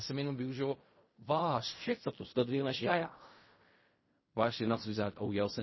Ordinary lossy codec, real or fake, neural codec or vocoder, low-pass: MP3, 24 kbps; fake; codec, 16 kHz in and 24 kHz out, 0.4 kbps, LongCat-Audio-Codec, fine tuned four codebook decoder; 7.2 kHz